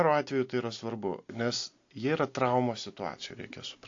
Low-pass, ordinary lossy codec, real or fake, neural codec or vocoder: 7.2 kHz; AAC, 48 kbps; real; none